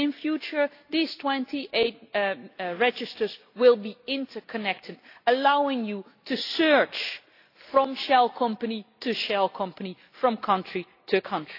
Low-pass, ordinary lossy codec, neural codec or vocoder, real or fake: 5.4 kHz; AAC, 32 kbps; none; real